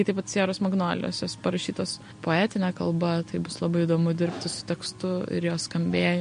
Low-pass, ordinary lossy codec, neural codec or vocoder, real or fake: 9.9 kHz; MP3, 48 kbps; none; real